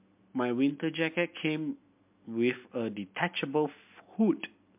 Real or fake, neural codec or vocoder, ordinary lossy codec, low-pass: real; none; MP3, 32 kbps; 3.6 kHz